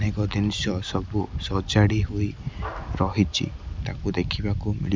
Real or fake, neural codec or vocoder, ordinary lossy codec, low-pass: real; none; none; none